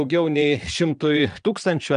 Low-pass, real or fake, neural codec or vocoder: 9.9 kHz; fake; vocoder, 22.05 kHz, 80 mel bands, Vocos